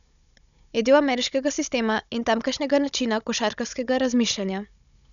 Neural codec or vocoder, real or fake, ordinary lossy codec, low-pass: codec, 16 kHz, 16 kbps, FunCodec, trained on Chinese and English, 50 frames a second; fake; none; 7.2 kHz